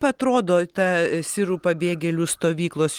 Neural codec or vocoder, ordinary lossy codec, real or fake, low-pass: none; Opus, 24 kbps; real; 19.8 kHz